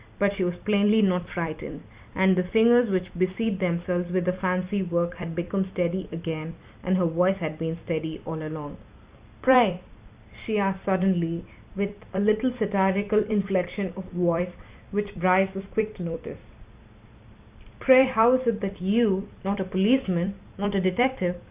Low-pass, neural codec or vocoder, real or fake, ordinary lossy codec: 3.6 kHz; vocoder, 44.1 kHz, 128 mel bands every 256 samples, BigVGAN v2; fake; Opus, 64 kbps